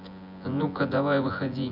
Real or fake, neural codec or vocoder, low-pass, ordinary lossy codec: fake; vocoder, 24 kHz, 100 mel bands, Vocos; 5.4 kHz; none